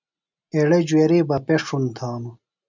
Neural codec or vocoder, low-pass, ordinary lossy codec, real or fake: none; 7.2 kHz; MP3, 64 kbps; real